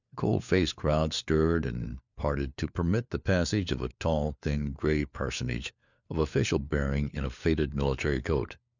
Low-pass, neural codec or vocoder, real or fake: 7.2 kHz; codec, 16 kHz, 4 kbps, FunCodec, trained on LibriTTS, 50 frames a second; fake